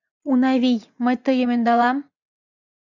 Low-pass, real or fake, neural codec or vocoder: 7.2 kHz; fake; vocoder, 44.1 kHz, 80 mel bands, Vocos